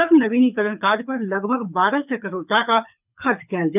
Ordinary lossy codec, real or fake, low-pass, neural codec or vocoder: none; fake; 3.6 kHz; codec, 24 kHz, 6 kbps, HILCodec